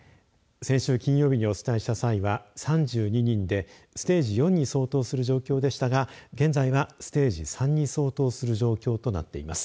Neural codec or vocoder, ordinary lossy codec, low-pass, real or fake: none; none; none; real